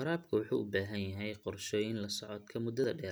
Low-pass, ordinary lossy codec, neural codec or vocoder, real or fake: none; none; none; real